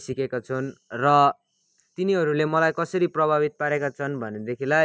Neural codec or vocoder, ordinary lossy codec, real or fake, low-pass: none; none; real; none